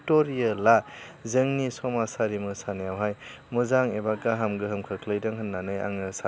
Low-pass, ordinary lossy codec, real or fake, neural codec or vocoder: none; none; real; none